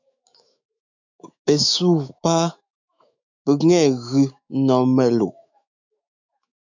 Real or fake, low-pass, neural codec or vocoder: fake; 7.2 kHz; autoencoder, 48 kHz, 128 numbers a frame, DAC-VAE, trained on Japanese speech